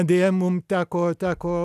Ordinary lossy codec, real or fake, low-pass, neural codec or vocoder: AAC, 96 kbps; real; 14.4 kHz; none